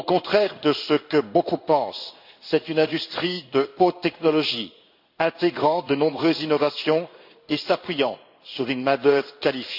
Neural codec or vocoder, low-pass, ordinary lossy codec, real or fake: codec, 16 kHz in and 24 kHz out, 1 kbps, XY-Tokenizer; 5.4 kHz; none; fake